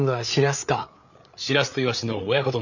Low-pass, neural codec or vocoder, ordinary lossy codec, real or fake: 7.2 kHz; codec, 16 kHz, 8 kbps, FreqCodec, larger model; none; fake